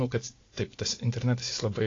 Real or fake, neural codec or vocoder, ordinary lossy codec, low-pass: real; none; AAC, 32 kbps; 7.2 kHz